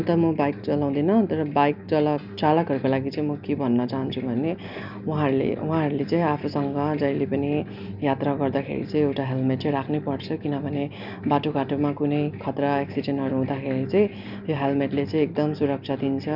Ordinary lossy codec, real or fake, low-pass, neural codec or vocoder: none; real; 5.4 kHz; none